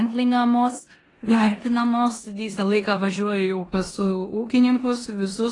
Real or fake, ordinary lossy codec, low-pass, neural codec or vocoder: fake; AAC, 32 kbps; 10.8 kHz; codec, 16 kHz in and 24 kHz out, 0.9 kbps, LongCat-Audio-Codec, four codebook decoder